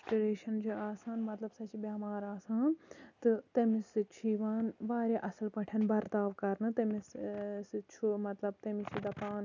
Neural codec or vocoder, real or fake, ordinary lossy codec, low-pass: none; real; none; 7.2 kHz